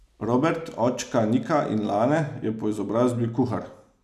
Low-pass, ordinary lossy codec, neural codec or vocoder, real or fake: 14.4 kHz; none; none; real